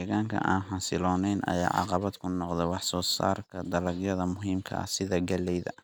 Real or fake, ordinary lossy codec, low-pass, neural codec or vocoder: real; none; none; none